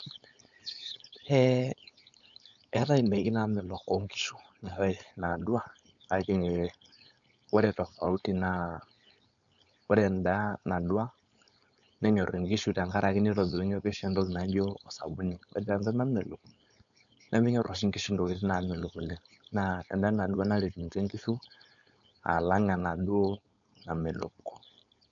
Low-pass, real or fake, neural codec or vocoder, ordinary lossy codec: 7.2 kHz; fake; codec, 16 kHz, 4.8 kbps, FACodec; none